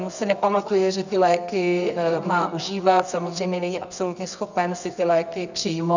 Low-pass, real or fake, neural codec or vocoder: 7.2 kHz; fake; codec, 24 kHz, 0.9 kbps, WavTokenizer, medium music audio release